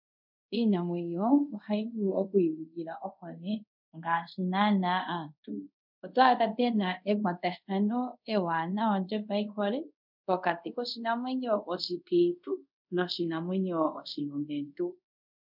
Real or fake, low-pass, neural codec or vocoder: fake; 5.4 kHz; codec, 24 kHz, 0.5 kbps, DualCodec